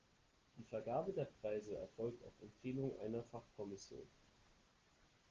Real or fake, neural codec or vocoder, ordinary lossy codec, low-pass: real; none; Opus, 16 kbps; 7.2 kHz